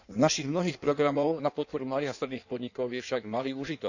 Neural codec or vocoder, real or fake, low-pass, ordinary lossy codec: codec, 16 kHz in and 24 kHz out, 1.1 kbps, FireRedTTS-2 codec; fake; 7.2 kHz; none